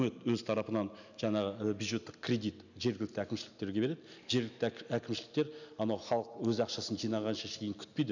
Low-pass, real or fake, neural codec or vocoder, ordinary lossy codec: 7.2 kHz; real; none; none